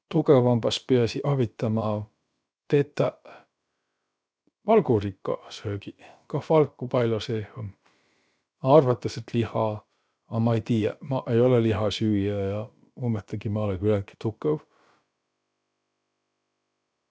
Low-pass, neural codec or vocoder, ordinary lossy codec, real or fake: none; codec, 16 kHz, about 1 kbps, DyCAST, with the encoder's durations; none; fake